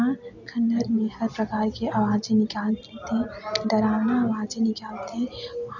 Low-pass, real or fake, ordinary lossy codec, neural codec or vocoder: 7.2 kHz; real; none; none